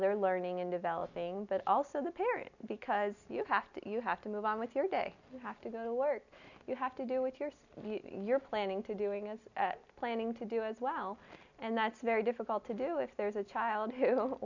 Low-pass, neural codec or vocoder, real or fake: 7.2 kHz; none; real